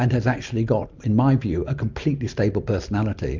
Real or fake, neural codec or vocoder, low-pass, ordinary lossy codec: real; none; 7.2 kHz; MP3, 64 kbps